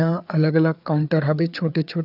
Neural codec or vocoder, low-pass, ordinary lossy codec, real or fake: codec, 16 kHz, 4 kbps, FreqCodec, larger model; 5.4 kHz; none; fake